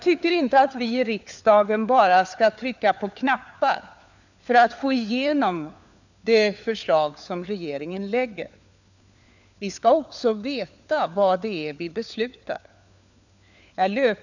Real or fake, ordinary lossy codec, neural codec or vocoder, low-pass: fake; none; codec, 16 kHz, 4 kbps, FunCodec, trained on LibriTTS, 50 frames a second; 7.2 kHz